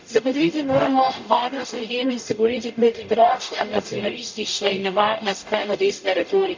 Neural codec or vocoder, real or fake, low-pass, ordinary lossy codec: codec, 44.1 kHz, 0.9 kbps, DAC; fake; 7.2 kHz; MP3, 64 kbps